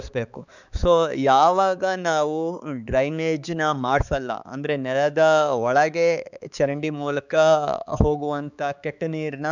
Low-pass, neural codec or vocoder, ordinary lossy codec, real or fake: 7.2 kHz; codec, 16 kHz, 4 kbps, X-Codec, HuBERT features, trained on balanced general audio; none; fake